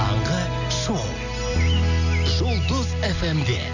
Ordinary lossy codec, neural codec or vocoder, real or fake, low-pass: none; none; real; 7.2 kHz